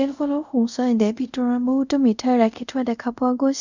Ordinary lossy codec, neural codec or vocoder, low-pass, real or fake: none; codec, 24 kHz, 0.5 kbps, DualCodec; 7.2 kHz; fake